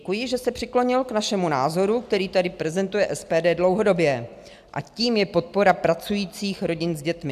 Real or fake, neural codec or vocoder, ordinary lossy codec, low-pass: real; none; AAC, 96 kbps; 14.4 kHz